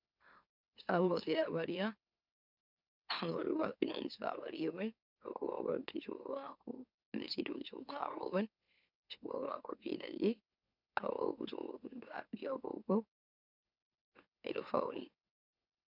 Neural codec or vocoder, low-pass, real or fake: autoencoder, 44.1 kHz, a latent of 192 numbers a frame, MeloTTS; 5.4 kHz; fake